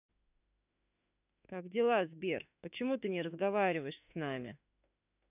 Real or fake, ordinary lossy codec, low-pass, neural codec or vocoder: fake; none; 3.6 kHz; autoencoder, 48 kHz, 32 numbers a frame, DAC-VAE, trained on Japanese speech